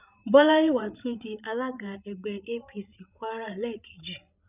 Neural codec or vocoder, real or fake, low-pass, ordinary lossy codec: codec, 16 kHz, 8 kbps, FreqCodec, larger model; fake; 3.6 kHz; none